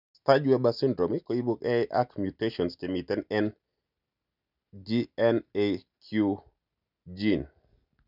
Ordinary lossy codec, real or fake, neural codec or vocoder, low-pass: none; real; none; 5.4 kHz